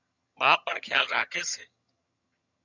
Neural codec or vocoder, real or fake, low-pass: vocoder, 22.05 kHz, 80 mel bands, HiFi-GAN; fake; 7.2 kHz